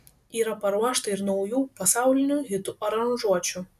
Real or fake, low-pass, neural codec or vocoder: real; 14.4 kHz; none